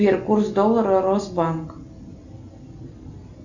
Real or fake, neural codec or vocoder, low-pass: real; none; 7.2 kHz